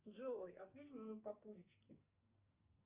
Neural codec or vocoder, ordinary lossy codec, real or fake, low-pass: codec, 44.1 kHz, 2.6 kbps, SNAC; Opus, 64 kbps; fake; 3.6 kHz